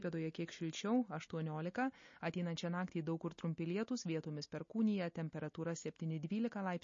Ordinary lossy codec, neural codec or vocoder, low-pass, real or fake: MP3, 32 kbps; none; 7.2 kHz; real